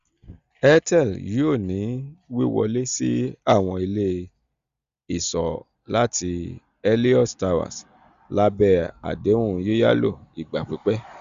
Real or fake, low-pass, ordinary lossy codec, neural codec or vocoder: real; 7.2 kHz; Opus, 64 kbps; none